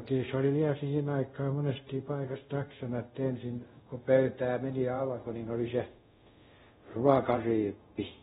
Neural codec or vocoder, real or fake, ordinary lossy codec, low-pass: codec, 24 kHz, 0.5 kbps, DualCodec; fake; AAC, 16 kbps; 10.8 kHz